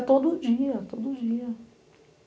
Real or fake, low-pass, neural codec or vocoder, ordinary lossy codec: real; none; none; none